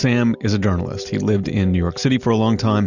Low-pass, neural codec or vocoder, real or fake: 7.2 kHz; none; real